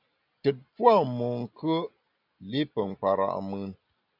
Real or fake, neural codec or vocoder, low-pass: real; none; 5.4 kHz